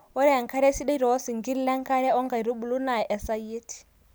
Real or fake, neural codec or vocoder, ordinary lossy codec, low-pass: real; none; none; none